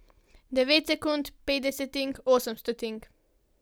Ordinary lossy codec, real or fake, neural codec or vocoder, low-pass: none; real; none; none